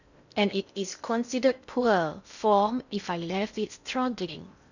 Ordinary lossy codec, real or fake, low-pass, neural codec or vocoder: none; fake; 7.2 kHz; codec, 16 kHz in and 24 kHz out, 0.6 kbps, FocalCodec, streaming, 2048 codes